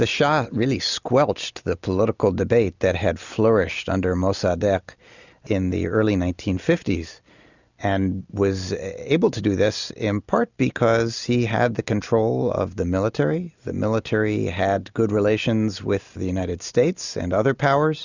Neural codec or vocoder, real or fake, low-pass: none; real; 7.2 kHz